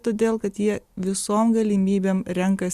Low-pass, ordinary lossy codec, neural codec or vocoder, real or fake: 14.4 kHz; Opus, 64 kbps; none; real